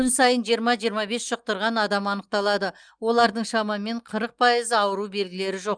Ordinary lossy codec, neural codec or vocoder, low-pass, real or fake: Opus, 32 kbps; none; 9.9 kHz; real